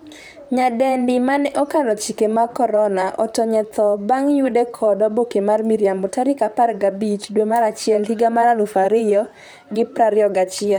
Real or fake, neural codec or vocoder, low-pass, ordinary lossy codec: fake; vocoder, 44.1 kHz, 128 mel bands, Pupu-Vocoder; none; none